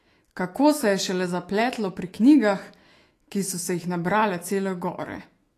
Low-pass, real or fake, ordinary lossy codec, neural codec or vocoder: 14.4 kHz; fake; AAC, 48 kbps; autoencoder, 48 kHz, 128 numbers a frame, DAC-VAE, trained on Japanese speech